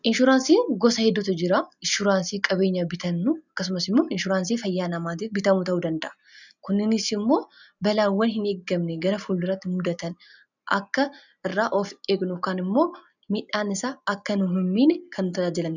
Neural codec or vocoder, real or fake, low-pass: none; real; 7.2 kHz